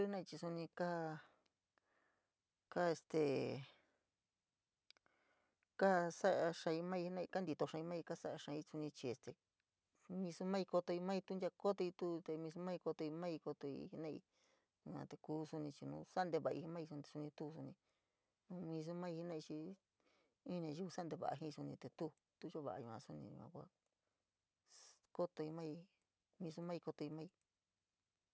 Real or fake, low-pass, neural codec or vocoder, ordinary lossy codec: real; none; none; none